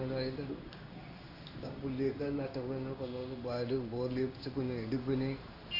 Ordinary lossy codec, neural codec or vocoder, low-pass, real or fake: AAC, 48 kbps; codec, 16 kHz in and 24 kHz out, 1 kbps, XY-Tokenizer; 5.4 kHz; fake